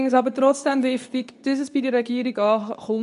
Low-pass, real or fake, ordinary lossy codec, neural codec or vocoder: 10.8 kHz; fake; none; codec, 24 kHz, 0.9 kbps, WavTokenizer, medium speech release version 2